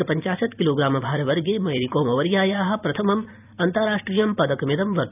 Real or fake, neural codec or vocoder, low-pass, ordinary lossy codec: fake; vocoder, 44.1 kHz, 128 mel bands every 256 samples, BigVGAN v2; 3.6 kHz; none